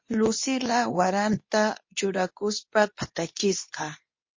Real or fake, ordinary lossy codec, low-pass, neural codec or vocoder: fake; MP3, 32 kbps; 7.2 kHz; codec, 24 kHz, 0.9 kbps, WavTokenizer, medium speech release version 2